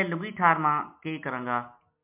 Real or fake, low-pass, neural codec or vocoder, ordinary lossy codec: real; 3.6 kHz; none; MP3, 32 kbps